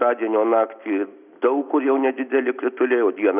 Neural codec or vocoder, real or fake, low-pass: none; real; 3.6 kHz